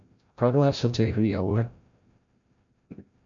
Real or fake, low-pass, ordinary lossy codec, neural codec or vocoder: fake; 7.2 kHz; MP3, 64 kbps; codec, 16 kHz, 0.5 kbps, FreqCodec, larger model